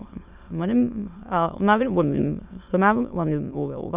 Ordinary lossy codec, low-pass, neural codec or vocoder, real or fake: Opus, 64 kbps; 3.6 kHz; autoencoder, 22.05 kHz, a latent of 192 numbers a frame, VITS, trained on many speakers; fake